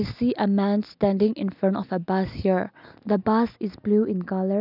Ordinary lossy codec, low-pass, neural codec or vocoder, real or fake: none; 5.4 kHz; none; real